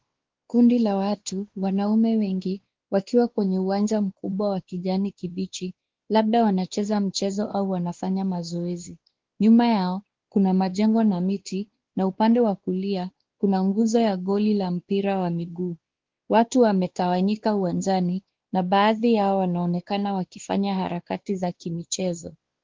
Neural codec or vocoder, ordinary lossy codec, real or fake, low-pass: codec, 16 kHz, 2 kbps, X-Codec, WavLM features, trained on Multilingual LibriSpeech; Opus, 16 kbps; fake; 7.2 kHz